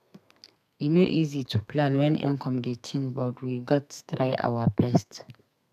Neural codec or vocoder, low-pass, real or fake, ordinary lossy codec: codec, 32 kHz, 1.9 kbps, SNAC; 14.4 kHz; fake; none